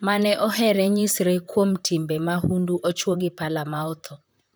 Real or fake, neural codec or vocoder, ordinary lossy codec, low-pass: fake; vocoder, 44.1 kHz, 128 mel bands, Pupu-Vocoder; none; none